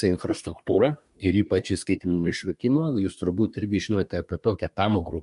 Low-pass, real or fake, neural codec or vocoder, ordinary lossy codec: 10.8 kHz; fake; codec, 24 kHz, 1 kbps, SNAC; MP3, 64 kbps